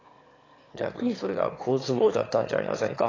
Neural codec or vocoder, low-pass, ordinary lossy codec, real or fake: autoencoder, 22.05 kHz, a latent of 192 numbers a frame, VITS, trained on one speaker; 7.2 kHz; AAC, 32 kbps; fake